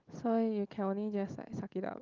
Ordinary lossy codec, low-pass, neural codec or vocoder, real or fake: Opus, 24 kbps; 7.2 kHz; none; real